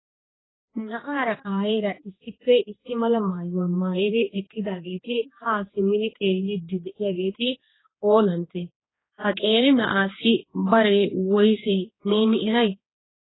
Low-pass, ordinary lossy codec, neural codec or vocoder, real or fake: 7.2 kHz; AAC, 16 kbps; codec, 16 kHz, 2 kbps, X-Codec, HuBERT features, trained on general audio; fake